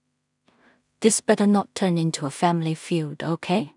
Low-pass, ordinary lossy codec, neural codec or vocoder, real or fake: 10.8 kHz; none; codec, 16 kHz in and 24 kHz out, 0.4 kbps, LongCat-Audio-Codec, two codebook decoder; fake